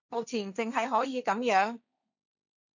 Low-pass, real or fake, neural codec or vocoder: 7.2 kHz; fake; codec, 16 kHz, 1.1 kbps, Voila-Tokenizer